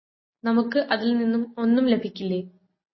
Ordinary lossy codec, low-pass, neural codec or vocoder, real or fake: MP3, 24 kbps; 7.2 kHz; none; real